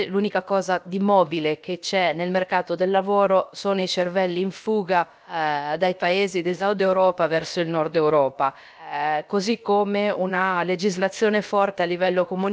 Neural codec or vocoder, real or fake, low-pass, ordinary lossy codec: codec, 16 kHz, about 1 kbps, DyCAST, with the encoder's durations; fake; none; none